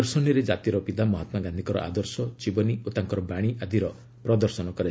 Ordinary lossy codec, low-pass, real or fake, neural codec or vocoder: none; none; real; none